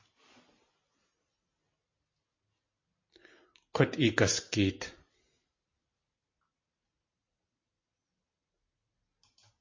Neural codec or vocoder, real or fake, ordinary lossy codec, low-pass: none; real; MP3, 32 kbps; 7.2 kHz